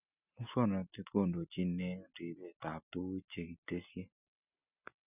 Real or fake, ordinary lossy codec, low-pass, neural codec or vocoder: real; none; 3.6 kHz; none